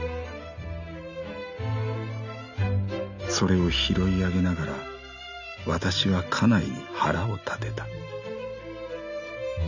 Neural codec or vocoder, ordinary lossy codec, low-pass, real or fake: none; none; 7.2 kHz; real